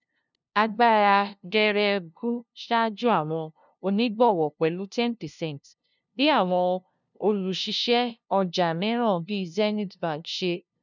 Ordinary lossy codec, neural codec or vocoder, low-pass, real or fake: none; codec, 16 kHz, 0.5 kbps, FunCodec, trained on LibriTTS, 25 frames a second; 7.2 kHz; fake